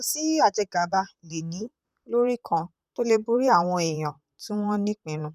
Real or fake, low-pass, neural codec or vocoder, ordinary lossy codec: fake; 14.4 kHz; vocoder, 44.1 kHz, 128 mel bands, Pupu-Vocoder; none